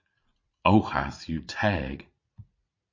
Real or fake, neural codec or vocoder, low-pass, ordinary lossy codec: fake; vocoder, 44.1 kHz, 80 mel bands, Vocos; 7.2 kHz; MP3, 48 kbps